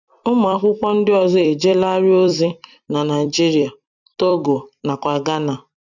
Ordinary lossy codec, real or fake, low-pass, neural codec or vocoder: none; fake; 7.2 kHz; vocoder, 44.1 kHz, 128 mel bands every 256 samples, BigVGAN v2